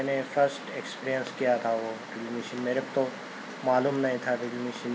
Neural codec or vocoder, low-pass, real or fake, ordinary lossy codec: none; none; real; none